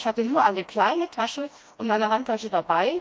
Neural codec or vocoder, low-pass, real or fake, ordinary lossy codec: codec, 16 kHz, 1 kbps, FreqCodec, smaller model; none; fake; none